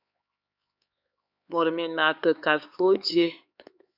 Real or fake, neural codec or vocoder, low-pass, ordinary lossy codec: fake; codec, 16 kHz, 4 kbps, X-Codec, HuBERT features, trained on LibriSpeech; 5.4 kHz; Opus, 64 kbps